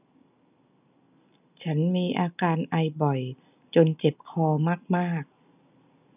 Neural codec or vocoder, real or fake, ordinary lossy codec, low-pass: none; real; none; 3.6 kHz